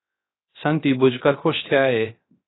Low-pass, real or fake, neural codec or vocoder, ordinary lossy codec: 7.2 kHz; fake; codec, 16 kHz, 0.3 kbps, FocalCodec; AAC, 16 kbps